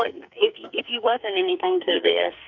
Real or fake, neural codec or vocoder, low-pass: fake; codec, 44.1 kHz, 2.6 kbps, SNAC; 7.2 kHz